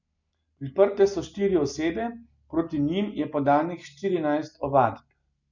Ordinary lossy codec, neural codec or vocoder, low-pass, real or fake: none; none; 7.2 kHz; real